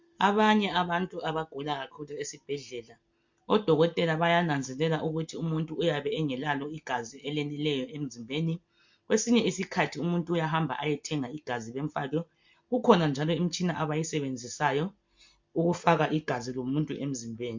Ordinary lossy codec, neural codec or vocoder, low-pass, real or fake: MP3, 48 kbps; none; 7.2 kHz; real